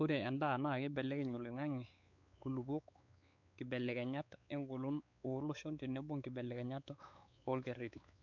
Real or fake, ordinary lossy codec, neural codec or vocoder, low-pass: fake; none; codec, 16 kHz, 4 kbps, X-Codec, WavLM features, trained on Multilingual LibriSpeech; 7.2 kHz